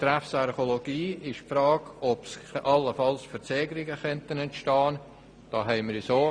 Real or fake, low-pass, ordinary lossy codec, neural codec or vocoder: real; 9.9 kHz; Opus, 64 kbps; none